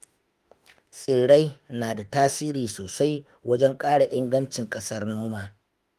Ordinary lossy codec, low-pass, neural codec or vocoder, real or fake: Opus, 32 kbps; 14.4 kHz; autoencoder, 48 kHz, 32 numbers a frame, DAC-VAE, trained on Japanese speech; fake